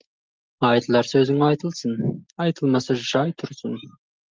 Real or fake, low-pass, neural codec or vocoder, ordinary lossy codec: real; 7.2 kHz; none; Opus, 32 kbps